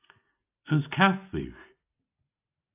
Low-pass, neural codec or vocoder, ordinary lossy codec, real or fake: 3.6 kHz; none; AAC, 32 kbps; real